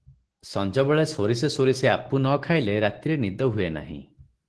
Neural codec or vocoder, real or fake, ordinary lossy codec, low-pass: autoencoder, 48 kHz, 128 numbers a frame, DAC-VAE, trained on Japanese speech; fake; Opus, 16 kbps; 10.8 kHz